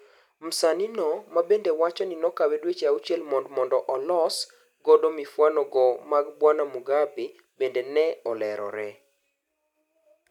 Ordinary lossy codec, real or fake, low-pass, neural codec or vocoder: none; real; 19.8 kHz; none